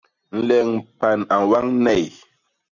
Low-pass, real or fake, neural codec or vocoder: 7.2 kHz; real; none